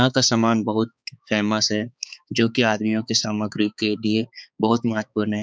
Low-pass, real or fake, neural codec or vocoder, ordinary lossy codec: none; fake; codec, 16 kHz, 4 kbps, X-Codec, HuBERT features, trained on balanced general audio; none